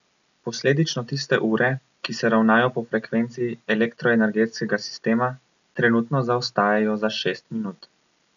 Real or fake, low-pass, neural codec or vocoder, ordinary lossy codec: real; 7.2 kHz; none; none